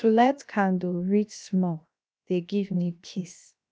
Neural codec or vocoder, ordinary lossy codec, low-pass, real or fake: codec, 16 kHz, 0.7 kbps, FocalCodec; none; none; fake